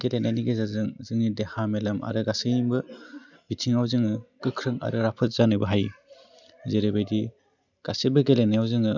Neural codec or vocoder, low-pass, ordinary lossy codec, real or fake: none; 7.2 kHz; none; real